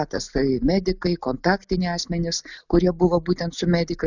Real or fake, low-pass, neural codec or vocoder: real; 7.2 kHz; none